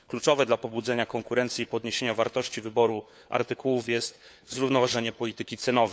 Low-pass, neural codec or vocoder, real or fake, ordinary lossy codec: none; codec, 16 kHz, 4 kbps, FunCodec, trained on LibriTTS, 50 frames a second; fake; none